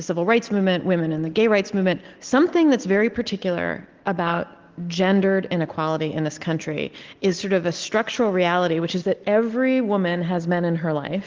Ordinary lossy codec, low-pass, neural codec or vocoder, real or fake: Opus, 16 kbps; 7.2 kHz; none; real